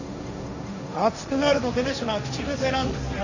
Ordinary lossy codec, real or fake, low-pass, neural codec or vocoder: none; fake; 7.2 kHz; codec, 16 kHz, 1.1 kbps, Voila-Tokenizer